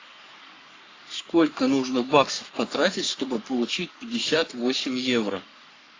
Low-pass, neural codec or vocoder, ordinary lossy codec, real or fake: 7.2 kHz; codec, 44.1 kHz, 3.4 kbps, Pupu-Codec; AAC, 32 kbps; fake